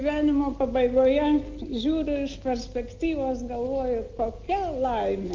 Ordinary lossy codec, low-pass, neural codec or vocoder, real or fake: Opus, 24 kbps; 7.2 kHz; none; real